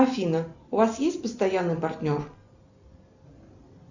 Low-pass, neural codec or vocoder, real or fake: 7.2 kHz; none; real